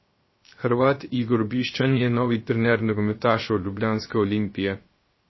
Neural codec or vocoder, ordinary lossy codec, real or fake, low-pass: codec, 16 kHz, 0.3 kbps, FocalCodec; MP3, 24 kbps; fake; 7.2 kHz